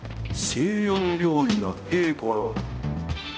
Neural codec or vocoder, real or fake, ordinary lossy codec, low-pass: codec, 16 kHz, 0.5 kbps, X-Codec, HuBERT features, trained on balanced general audio; fake; none; none